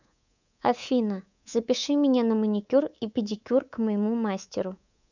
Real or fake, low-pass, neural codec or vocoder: fake; 7.2 kHz; codec, 24 kHz, 3.1 kbps, DualCodec